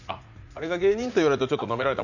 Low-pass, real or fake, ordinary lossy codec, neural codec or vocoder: 7.2 kHz; fake; none; vocoder, 44.1 kHz, 80 mel bands, Vocos